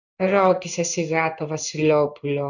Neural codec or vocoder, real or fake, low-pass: codec, 16 kHz in and 24 kHz out, 1 kbps, XY-Tokenizer; fake; 7.2 kHz